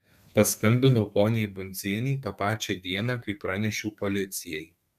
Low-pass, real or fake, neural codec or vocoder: 14.4 kHz; fake; codec, 32 kHz, 1.9 kbps, SNAC